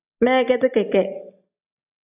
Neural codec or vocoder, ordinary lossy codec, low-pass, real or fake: none; AAC, 24 kbps; 3.6 kHz; real